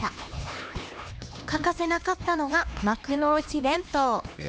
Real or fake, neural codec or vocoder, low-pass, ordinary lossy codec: fake; codec, 16 kHz, 2 kbps, X-Codec, HuBERT features, trained on LibriSpeech; none; none